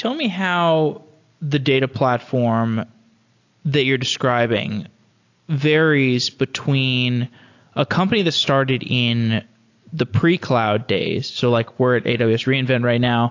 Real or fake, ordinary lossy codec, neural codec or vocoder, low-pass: real; AAC, 48 kbps; none; 7.2 kHz